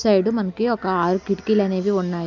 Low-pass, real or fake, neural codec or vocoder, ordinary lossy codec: 7.2 kHz; real; none; none